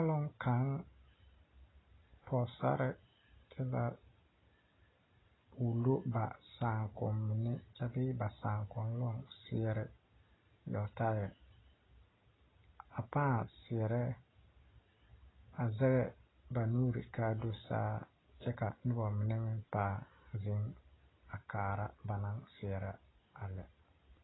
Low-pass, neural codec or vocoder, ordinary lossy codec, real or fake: 7.2 kHz; none; AAC, 16 kbps; real